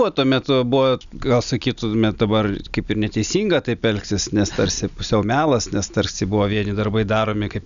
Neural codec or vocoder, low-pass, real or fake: none; 7.2 kHz; real